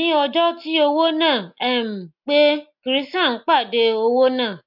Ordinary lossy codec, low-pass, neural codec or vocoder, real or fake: MP3, 48 kbps; 5.4 kHz; none; real